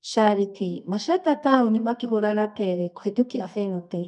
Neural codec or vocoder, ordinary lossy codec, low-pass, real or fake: codec, 24 kHz, 0.9 kbps, WavTokenizer, medium music audio release; none; 10.8 kHz; fake